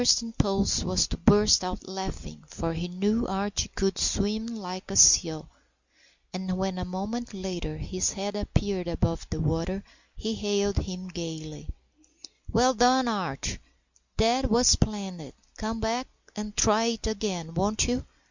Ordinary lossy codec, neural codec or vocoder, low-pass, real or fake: Opus, 64 kbps; none; 7.2 kHz; real